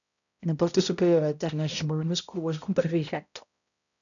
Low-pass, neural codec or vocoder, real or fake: 7.2 kHz; codec, 16 kHz, 0.5 kbps, X-Codec, HuBERT features, trained on balanced general audio; fake